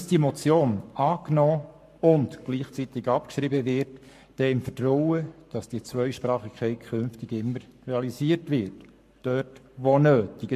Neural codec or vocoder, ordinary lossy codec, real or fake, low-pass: codec, 44.1 kHz, 7.8 kbps, Pupu-Codec; MP3, 64 kbps; fake; 14.4 kHz